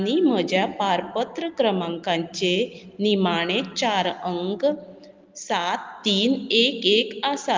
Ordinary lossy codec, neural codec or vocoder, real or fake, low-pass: Opus, 24 kbps; none; real; 7.2 kHz